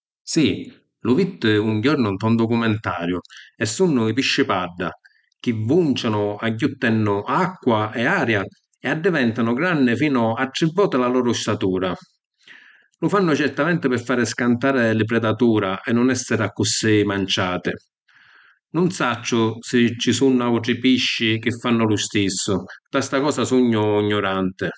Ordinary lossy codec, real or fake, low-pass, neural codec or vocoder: none; real; none; none